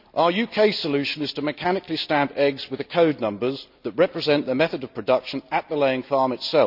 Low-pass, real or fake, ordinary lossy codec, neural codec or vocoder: 5.4 kHz; real; none; none